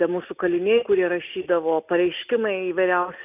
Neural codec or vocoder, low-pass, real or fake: none; 3.6 kHz; real